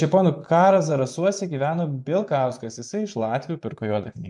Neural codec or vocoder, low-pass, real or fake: vocoder, 24 kHz, 100 mel bands, Vocos; 10.8 kHz; fake